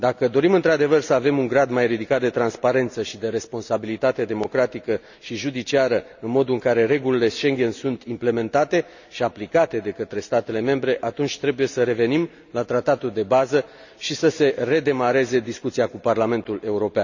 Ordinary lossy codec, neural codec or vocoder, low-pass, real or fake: none; none; 7.2 kHz; real